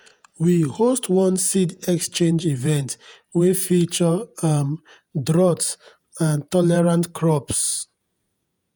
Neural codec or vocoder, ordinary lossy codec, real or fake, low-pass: vocoder, 48 kHz, 128 mel bands, Vocos; none; fake; none